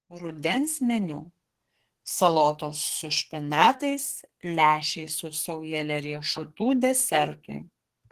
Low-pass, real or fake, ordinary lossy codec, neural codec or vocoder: 14.4 kHz; fake; Opus, 16 kbps; codec, 32 kHz, 1.9 kbps, SNAC